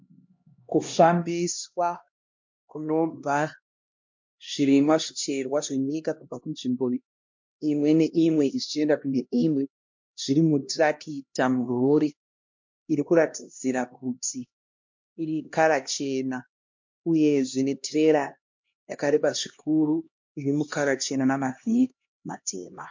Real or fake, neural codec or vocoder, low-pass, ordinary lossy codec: fake; codec, 16 kHz, 1 kbps, X-Codec, HuBERT features, trained on LibriSpeech; 7.2 kHz; MP3, 48 kbps